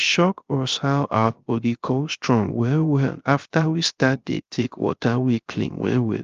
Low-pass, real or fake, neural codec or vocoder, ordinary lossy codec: 7.2 kHz; fake; codec, 16 kHz, 0.3 kbps, FocalCodec; Opus, 32 kbps